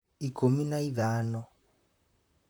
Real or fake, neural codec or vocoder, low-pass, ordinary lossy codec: fake; vocoder, 44.1 kHz, 128 mel bands, Pupu-Vocoder; none; none